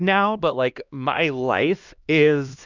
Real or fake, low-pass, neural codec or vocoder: fake; 7.2 kHz; codec, 16 kHz, 1 kbps, X-Codec, HuBERT features, trained on LibriSpeech